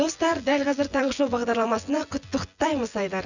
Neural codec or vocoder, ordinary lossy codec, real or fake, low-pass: vocoder, 24 kHz, 100 mel bands, Vocos; none; fake; 7.2 kHz